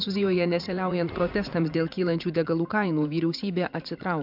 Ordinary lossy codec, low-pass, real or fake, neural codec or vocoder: MP3, 48 kbps; 5.4 kHz; fake; vocoder, 44.1 kHz, 80 mel bands, Vocos